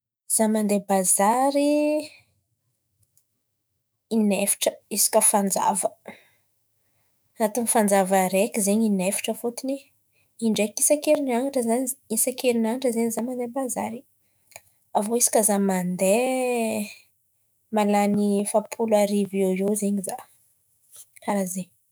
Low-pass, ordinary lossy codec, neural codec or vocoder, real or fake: none; none; autoencoder, 48 kHz, 128 numbers a frame, DAC-VAE, trained on Japanese speech; fake